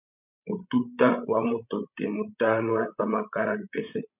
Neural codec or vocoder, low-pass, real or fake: codec, 16 kHz, 16 kbps, FreqCodec, larger model; 3.6 kHz; fake